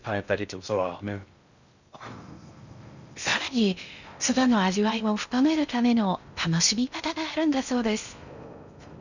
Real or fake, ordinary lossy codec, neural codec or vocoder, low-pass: fake; none; codec, 16 kHz in and 24 kHz out, 0.6 kbps, FocalCodec, streaming, 2048 codes; 7.2 kHz